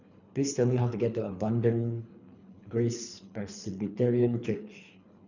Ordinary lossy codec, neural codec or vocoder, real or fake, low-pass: none; codec, 24 kHz, 3 kbps, HILCodec; fake; 7.2 kHz